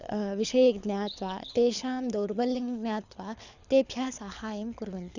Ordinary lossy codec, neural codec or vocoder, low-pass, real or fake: none; codec, 24 kHz, 6 kbps, HILCodec; 7.2 kHz; fake